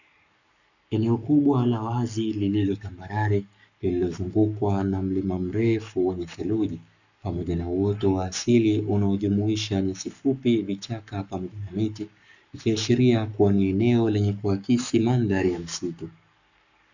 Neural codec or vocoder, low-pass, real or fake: codec, 44.1 kHz, 7.8 kbps, Pupu-Codec; 7.2 kHz; fake